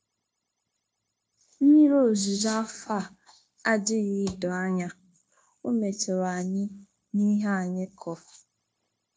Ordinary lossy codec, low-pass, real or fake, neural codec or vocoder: none; none; fake; codec, 16 kHz, 0.9 kbps, LongCat-Audio-Codec